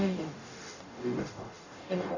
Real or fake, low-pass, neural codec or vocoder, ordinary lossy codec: fake; 7.2 kHz; codec, 44.1 kHz, 0.9 kbps, DAC; none